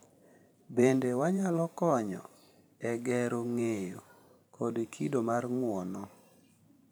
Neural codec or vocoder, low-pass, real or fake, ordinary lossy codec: vocoder, 44.1 kHz, 128 mel bands every 512 samples, BigVGAN v2; none; fake; none